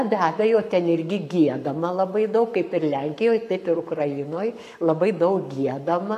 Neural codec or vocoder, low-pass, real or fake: codec, 44.1 kHz, 7.8 kbps, Pupu-Codec; 14.4 kHz; fake